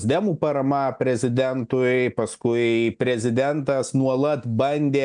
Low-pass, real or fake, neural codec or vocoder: 9.9 kHz; real; none